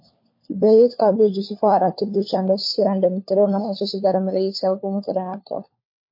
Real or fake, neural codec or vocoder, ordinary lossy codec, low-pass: fake; codec, 16 kHz, 4 kbps, FunCodec, trained on LibriTTS, 50 frames a second; MP3, 32 kbps; 5.4 kHz